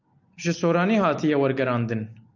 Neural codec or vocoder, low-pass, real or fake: none; 7.2 kHz; real